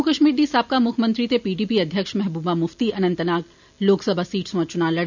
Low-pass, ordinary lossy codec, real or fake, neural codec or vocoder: 7.2 kHz; none; real; none